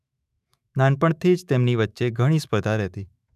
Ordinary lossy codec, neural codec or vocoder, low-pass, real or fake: none; autoencoder, 48 kHz, 128 numbers a frame, DAC-VAE, trained on Japanese speech; 14.4 kHz; fake